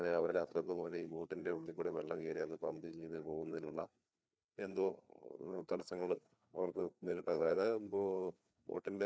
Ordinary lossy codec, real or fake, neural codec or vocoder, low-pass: none; fake; codec, 16 kHz, 4 kbps, FreqCodec, larger model; none